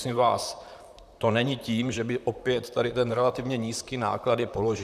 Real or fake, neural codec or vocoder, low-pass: fake; vocoder, 44.1 kHz, 128 mel bands, Pupu-Vocoder; 14.4 kHz